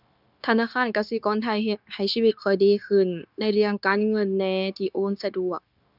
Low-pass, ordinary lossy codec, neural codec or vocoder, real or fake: 5.4 kHz; none; codec, 16 kHz, 0.9 kbps, LongCat-Audio-Codec; fake